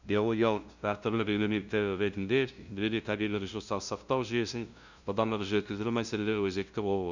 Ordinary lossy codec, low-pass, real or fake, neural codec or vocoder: none; 7.2 kHz; fake; codec, 16 kHz, 0.5 kbps, FunCodec, trained on LibriTTS, 25 frames a second